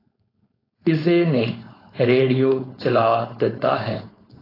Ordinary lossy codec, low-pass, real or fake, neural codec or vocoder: AAC, 24 kbps; 5.4 kHz; fake; codec, 16 kHz, 4.8 kbps, FACodec